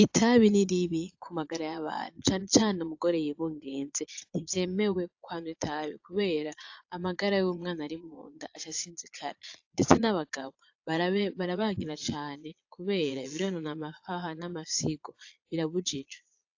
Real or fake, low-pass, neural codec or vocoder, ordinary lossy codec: fake; 7.2 kHz; vocoder, 22.05 kHz, 80 mel bands, Vocos; AAC, 48 kbps